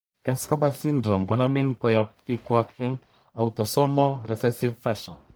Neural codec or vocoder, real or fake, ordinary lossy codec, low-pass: codec, 44.1 kHz, 1.7 kbps, Pupu-Codec; fake; none; none